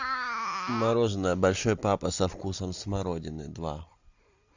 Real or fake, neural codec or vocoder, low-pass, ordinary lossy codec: real; none; 7.2 kHz; Opus, 64 kbps